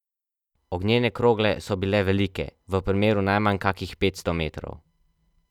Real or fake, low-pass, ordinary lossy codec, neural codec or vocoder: real; 19.8 kHz; none; none